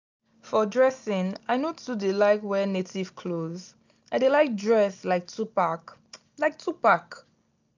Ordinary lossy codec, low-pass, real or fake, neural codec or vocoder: none; 7.2 kHz; real; none